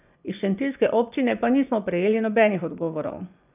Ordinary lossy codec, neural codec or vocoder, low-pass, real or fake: none; vocoder, 24 kHz, 100 mel bands, Vocos; 3.6 kHz; fake